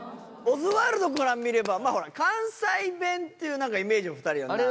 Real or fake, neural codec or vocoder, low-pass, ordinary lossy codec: real; none; none; none